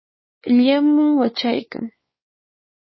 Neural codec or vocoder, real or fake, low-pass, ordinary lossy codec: codec, 16 kHz, 4 kbps, X-Codec, WavLM features, trained on Multilingual LibriSpeech; fake; 7.2 kHz; MP3, 24 kbps